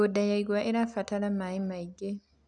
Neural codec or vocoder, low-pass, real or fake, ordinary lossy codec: none; 9.9 kHz; real; none